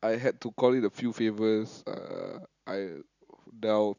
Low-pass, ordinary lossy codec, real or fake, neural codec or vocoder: 7.2 kHz; none; real; none